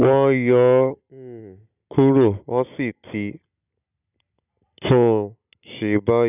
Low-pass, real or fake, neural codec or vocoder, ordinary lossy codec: 3.6 kHz; real; none; none